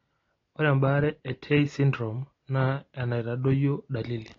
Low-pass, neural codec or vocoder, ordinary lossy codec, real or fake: 7.2 kHz; none; AAC, 32 kbps; real